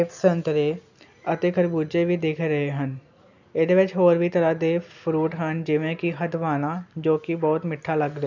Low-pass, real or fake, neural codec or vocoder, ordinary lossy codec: 7.2 kHz; real; none; none